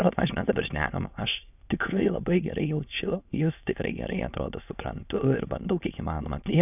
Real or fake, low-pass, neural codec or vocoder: fake; 3.6 kHz; autoencoder, 22.05 kHz, a latent of 192 numbers a frame, VITS, trained on many speakers